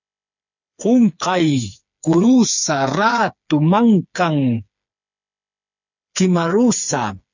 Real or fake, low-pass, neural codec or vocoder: fake; 7.2 kHz; codec, 16 kHz, 4 kbps, FreqCodec, smaller model